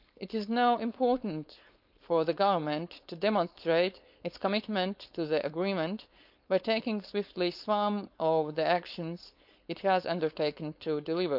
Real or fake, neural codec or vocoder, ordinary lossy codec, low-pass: fake; codec, 16 kHz, 4.8 kbps, FACodec; none; 5.4 kHz